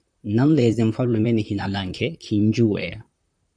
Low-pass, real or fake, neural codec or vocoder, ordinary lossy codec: 9.9 kHz; fake; vocoder, 44.1 kHz, 128 mel bands, Pupu-Vocoder; MP3, 96 kbps